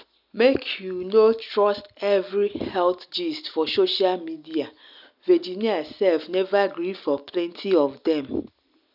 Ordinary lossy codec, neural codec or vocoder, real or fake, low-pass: none; none; real; 5.4 kHz